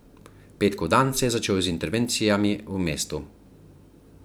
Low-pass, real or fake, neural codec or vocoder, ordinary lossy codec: none; fake; vocoder, 44.1 kHz, 128 mel bands every 512 samples, BigVGAN v2; none